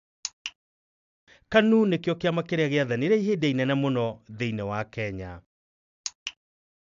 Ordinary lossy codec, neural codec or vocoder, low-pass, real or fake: AAC, 96 kbps; none; 7.2 kHz; real